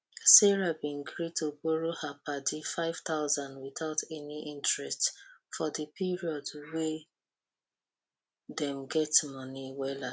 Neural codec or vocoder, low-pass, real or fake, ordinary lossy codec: none; none; real; none